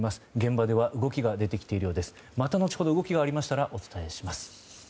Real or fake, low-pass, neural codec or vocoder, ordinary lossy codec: real; none; none; none